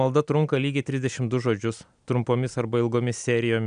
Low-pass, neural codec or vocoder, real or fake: 9.9 kHz; none; real